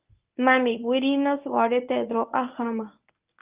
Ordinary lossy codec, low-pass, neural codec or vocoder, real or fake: Opus, 24 kbps; 3.6 kHz; codec, 24 kHz, 0.9 kbps, WavTokenizer, medium speech release version 1; fake